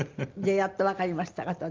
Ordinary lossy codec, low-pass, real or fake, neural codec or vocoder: Opus, 24 kbps; 7.2 kHz; real; none